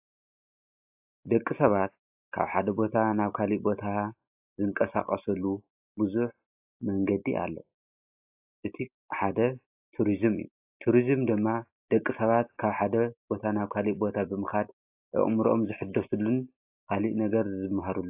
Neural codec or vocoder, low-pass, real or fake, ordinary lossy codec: none; 3.6 kHz; real; AAC, 32 kbps